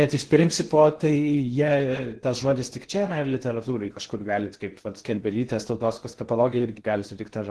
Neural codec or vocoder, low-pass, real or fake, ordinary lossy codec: codec, 16 kHz in and 24 kHz out, 0.6 kbps, FocalCodec, streaming, 4096 codes; 10.8 kHz; fake; Opus, 16 kbps